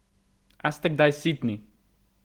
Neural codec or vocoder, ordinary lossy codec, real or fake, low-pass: none; Opus, 16 kbps; real; 19.8 kHz